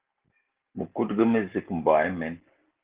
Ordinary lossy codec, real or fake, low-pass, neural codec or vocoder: Opus, 16 kbps; real; 3.6 kHz; none